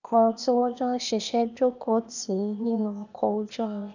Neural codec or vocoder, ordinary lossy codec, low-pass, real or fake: codec, 16 kHz, 0.8 kbps, ZipCodec; none; 7.2 kHz; fake